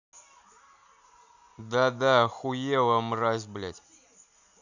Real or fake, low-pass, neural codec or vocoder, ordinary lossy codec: real; 7.2 kHz; none; none